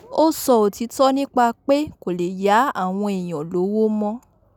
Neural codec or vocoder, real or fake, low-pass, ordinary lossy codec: none; real; 19.8 kHz; none